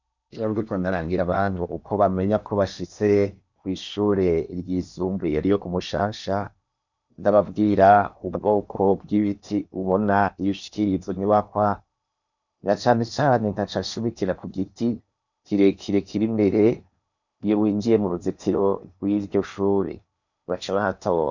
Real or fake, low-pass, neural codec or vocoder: fake; 7.2 kHz; codec, 16 kHz in and 24 kHz out, 0.8 kbps, FocalCodec, streaming, 65536 codes